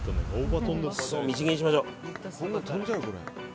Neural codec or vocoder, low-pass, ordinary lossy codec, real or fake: none; none; none; real